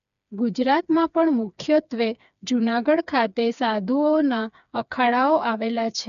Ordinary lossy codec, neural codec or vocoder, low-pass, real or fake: none; codec, 16 kHz, 4 kbps, FreqCodec, smaller model; 7.2 kHz; fake